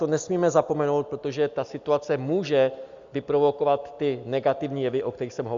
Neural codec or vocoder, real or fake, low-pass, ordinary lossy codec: none; real; 7.2 kHz; Opus, 64 kbps